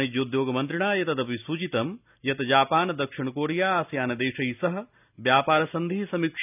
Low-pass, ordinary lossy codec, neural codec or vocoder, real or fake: 3.6 kHz; none; none; real